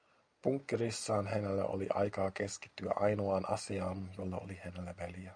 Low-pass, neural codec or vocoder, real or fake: 9.9 kHz; none; real